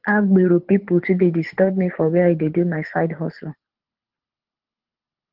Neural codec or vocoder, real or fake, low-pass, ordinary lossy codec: codec, 24 kHz, 6 kbps, HILCodec; fake; 5.4 kHz; Opus, 16 kbps